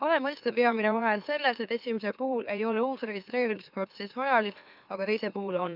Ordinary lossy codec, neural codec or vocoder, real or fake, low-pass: none; autoencoder, 44.1 kHz, a latent of 192 numbers a frame, MeloTTS; fake; 5.4 kHz